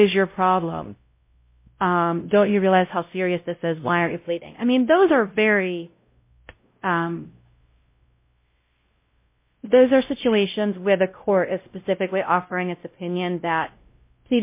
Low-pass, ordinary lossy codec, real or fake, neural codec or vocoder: 3.6 kHz; MP3, 24 kbps; fake; codec, 16 kHz, 0.5 kbps, X-Codec, WavLM features, trained on Multilingual LibriSpeech